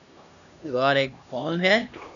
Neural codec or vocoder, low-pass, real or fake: codec, 16 kHz, 1 kbps, X-Codec, HuBERT features, trained on LibriSpeech; 7.2 kHz; fake